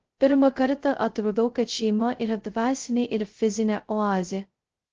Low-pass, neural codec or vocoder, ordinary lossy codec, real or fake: 7.2 kHz; codec, 16 kHz, 0.2 kbps, FocalCodec; Opus, 16 kbps; fake